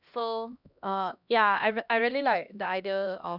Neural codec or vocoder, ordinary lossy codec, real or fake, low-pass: codec, 16 kHz, 1 kbps, X-Codec, HuBERT features, trained on LibriSpeech; none; fake; 5.4 kHz